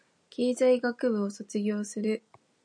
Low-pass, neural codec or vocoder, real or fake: 9.9 kHz; none; real